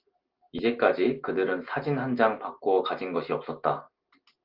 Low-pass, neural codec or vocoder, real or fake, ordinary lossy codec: 5.4 kHz; none; real; Opus, 32 kbps